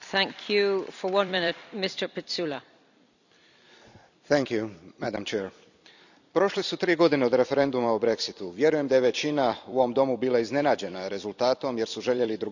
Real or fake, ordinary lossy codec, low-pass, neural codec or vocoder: fake; none; 7.2 kHz; vocoder, 44.1 kHz, 128 mel bands every 256 samples, BigVGAN v2